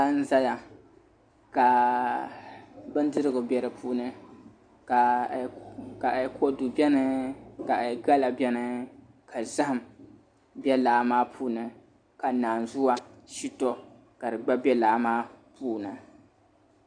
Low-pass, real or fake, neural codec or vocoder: 9.9 kHz; real; none